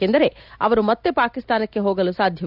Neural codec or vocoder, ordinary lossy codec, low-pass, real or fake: none; none; 5.4 kHz; real